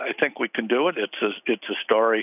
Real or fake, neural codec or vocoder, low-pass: real; none; 3.6 kHz